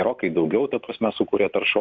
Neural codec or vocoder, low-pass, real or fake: none; 7.2 kHz; real